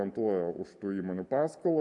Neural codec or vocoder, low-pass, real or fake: none; 10.8 kHz; real